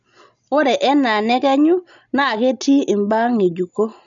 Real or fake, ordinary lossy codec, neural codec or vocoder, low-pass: real; none; none; 7.2 kHz